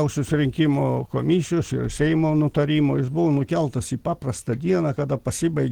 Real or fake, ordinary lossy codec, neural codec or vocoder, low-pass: real; Opus, 16 kbps; none; 19.8 kHz